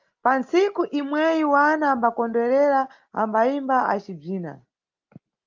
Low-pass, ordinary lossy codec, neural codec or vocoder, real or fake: 7.2 kHz; Opus, 32 kbps; none; real